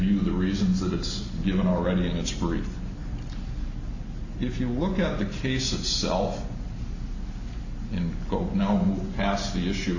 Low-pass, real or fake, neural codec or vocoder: 7.2 kHz; real; none